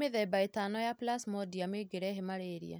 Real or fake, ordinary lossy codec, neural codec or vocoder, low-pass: real; none; none; none